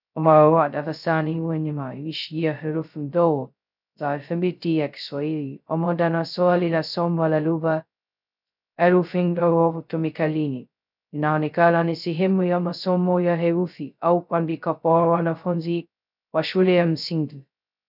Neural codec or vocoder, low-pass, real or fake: codec, 16 kHz, 0.2 kbps, FocalCodec; 5.4 kHz; fake